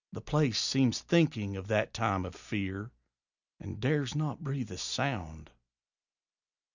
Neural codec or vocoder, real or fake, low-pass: none; real; 7.2 kHz